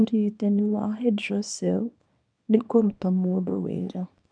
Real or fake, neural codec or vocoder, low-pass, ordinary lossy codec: fake; codec, 24 kHz, 0.9 kbps, WavTokenizer, small release; 9.9 kHz; none